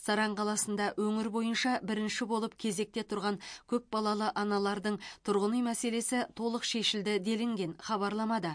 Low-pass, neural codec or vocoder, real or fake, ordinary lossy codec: 9.9 kHz; none; real; MP3, 48 kbps